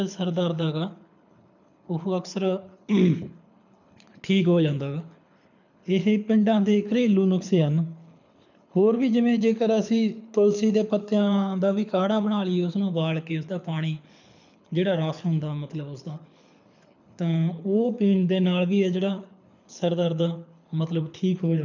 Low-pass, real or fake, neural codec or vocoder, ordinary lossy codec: 7.2 kHz; fake; codec, 24 kHz, 6 kbps, HILCodec; none